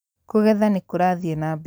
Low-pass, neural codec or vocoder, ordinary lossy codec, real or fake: none; none; none; real